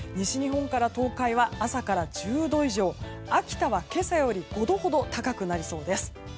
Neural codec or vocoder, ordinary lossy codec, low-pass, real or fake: none; none; none; real